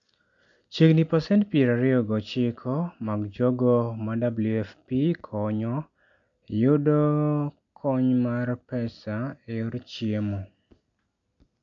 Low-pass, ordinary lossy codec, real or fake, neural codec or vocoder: 7.2 kHz; AAC, 64 kbps; real; none